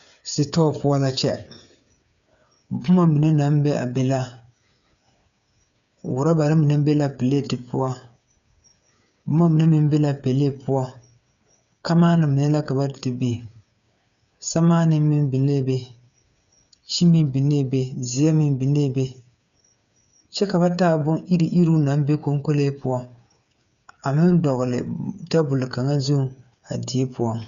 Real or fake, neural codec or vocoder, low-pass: fake; codec, 16 kHz, 8 kbps, FreqCodec, smaller model; 7.2 kHz